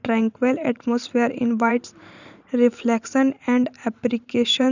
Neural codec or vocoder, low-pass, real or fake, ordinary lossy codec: vocoder, 44.1 kHz, 128 mel bands every 512 samples, BigVGAN v2; 7.2 kHz; fake; none